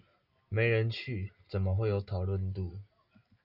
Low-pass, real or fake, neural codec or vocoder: 5.4 kHz; real; none